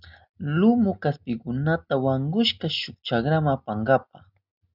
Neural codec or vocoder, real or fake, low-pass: none; real; 5.4 kHz